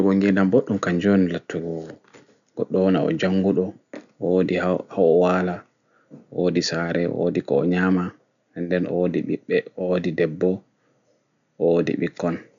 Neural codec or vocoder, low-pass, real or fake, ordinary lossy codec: none; 7.2 kHz; real; none